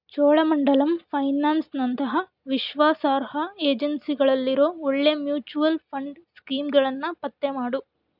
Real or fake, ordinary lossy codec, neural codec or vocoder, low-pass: real; none; none; 5.4 kHz